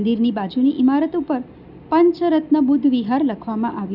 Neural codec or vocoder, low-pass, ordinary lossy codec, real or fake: none; 5.4 kHz; none; real